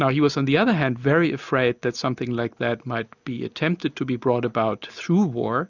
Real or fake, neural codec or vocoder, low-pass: real; none; 7.2 kHz